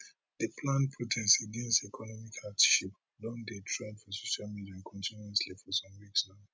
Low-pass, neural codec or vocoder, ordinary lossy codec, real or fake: none; none; none; real